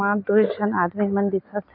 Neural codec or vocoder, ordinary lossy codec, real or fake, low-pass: none; MP3, 32 kbps; real; 5.4 kHz